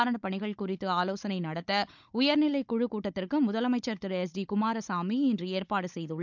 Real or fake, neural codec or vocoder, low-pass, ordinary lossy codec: fake; codec, 16 kHz, 16 kbps, FunCodec, trained on Chinese and English, 50 frames a second; 7.2 kHz; none